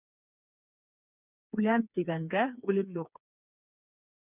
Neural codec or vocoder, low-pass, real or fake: codec, 16 kHz, 4 kbps, FreqCodec, smaller model; 3.6 kHz; fake